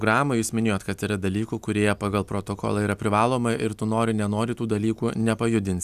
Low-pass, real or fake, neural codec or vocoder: 14.4 kHz; real; none